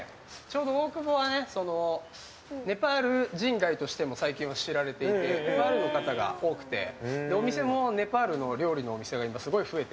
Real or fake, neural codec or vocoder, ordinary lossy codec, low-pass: real; none; none; none